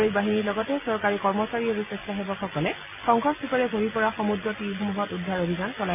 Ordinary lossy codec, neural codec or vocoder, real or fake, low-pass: none; none; real; 3.6 kHz